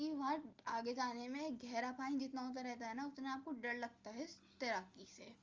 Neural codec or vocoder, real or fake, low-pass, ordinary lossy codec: none; real; 7.2 kHz; Opus, 32 kbps